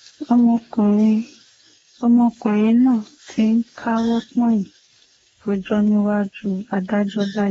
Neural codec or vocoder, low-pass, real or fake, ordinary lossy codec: codec, 44.1 kHz, 2.6 kbps, DAC; 19.8 kHz; fake; AAC, 24 kbps